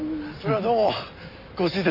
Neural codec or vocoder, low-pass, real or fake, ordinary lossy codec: none; 5.4 kHz; real; none